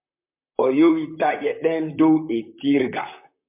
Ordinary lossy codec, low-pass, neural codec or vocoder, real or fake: MP3, 32 kbps; 3.6 kHz; vocoder, 44.1 kHz, 128 mel bands, Pupu-Vocoder; fake